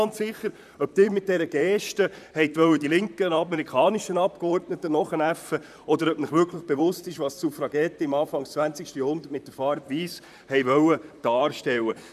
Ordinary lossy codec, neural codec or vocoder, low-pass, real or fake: none; vocoder, 44.1 kHz, 128 mel bands, Pupu-Vocoder; 14.4 kHz; fake